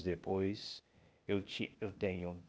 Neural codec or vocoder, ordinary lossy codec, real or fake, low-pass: codec, 16 kHz, 0.8 kbps, ZipCodec; none; fake; none